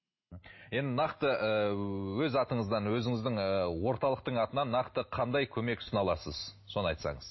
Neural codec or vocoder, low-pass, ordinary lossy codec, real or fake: none; 7.2 kHz; MP3, 24 kbps; real